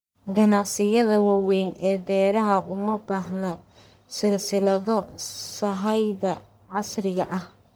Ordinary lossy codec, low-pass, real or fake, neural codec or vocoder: none; none; fake; codec, 44.1 kHz, 1.7 kbps, Pupu-Codec